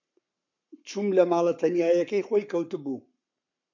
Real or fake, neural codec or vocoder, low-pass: fake; codec, 44.1 kHz, 7.8 kbps, Pupu-Codec; 7.2 kHz